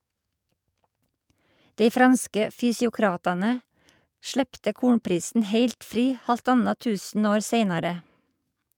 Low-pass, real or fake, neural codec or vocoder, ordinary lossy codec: 19.8 kHz; fake; codec, 44.1 kHz, 7.8 kbps, DAC; MP3, 96 kbps